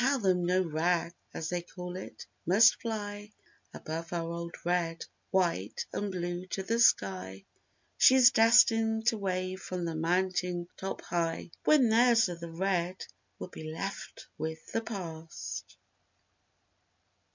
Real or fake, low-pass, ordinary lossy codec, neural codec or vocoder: real; 7.2 kHz; MP3, 64 kbps; none